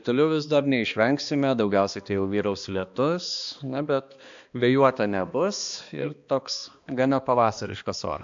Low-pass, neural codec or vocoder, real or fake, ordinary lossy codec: 7.2 kHz; codec, 16 kHz, 2 kbps, X-Codec, HuBERT features, trained on balanced general audio; fake; AAC, 64 kbps